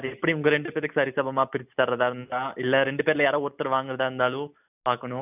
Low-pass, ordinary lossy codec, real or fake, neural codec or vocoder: 3.6 kHz; none; real; none